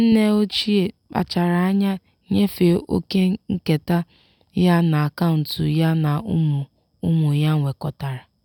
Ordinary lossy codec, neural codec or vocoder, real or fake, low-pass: none; none; real; 19.8 kHz